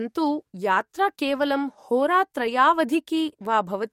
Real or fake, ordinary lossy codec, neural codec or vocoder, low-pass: fake; MP3, 64 kbps; codec, 44.1 kHz, 7.8 kbps, DAC; 19.8 kHz